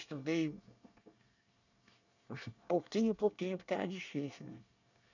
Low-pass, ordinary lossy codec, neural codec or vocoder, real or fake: 7.2 kHz; none; codec, 24 kHz, 1 kbps, SNAC; fake